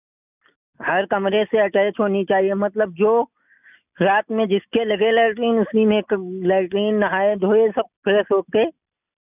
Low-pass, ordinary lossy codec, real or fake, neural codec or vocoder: 3.6 kHz; none; real; none